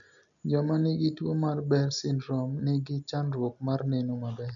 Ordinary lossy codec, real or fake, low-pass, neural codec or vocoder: none; real; 7.2 kHz; none